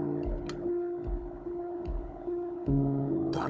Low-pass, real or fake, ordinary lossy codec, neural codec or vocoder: none; fake; none; codec, 16 kHz, 16 kbps, FunCodec, trained on Chinese and English, 50 frames a second